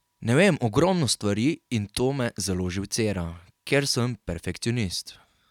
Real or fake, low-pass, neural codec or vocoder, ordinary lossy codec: real; 19.8 kHz; none; none